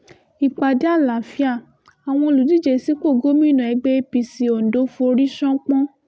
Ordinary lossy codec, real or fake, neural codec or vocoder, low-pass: none; real; none; none